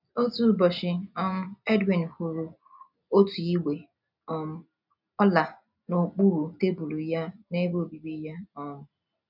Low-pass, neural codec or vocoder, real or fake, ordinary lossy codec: 5.4 kHz; none; real; none